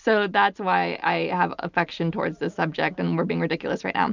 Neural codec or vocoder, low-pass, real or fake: none; 7.2 kHz; real